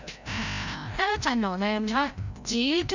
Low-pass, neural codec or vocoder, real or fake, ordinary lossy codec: 7.2 kHz; codec, 16 kHz, 0.5 kbps, FreqCodec, larger model; fake; none